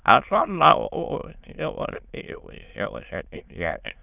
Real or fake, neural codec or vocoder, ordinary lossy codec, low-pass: fake; autoencoder, 22.05 kHz, a latent of 192 numbers a frame, VITS, trained on many speakers; none; 3.6 kHz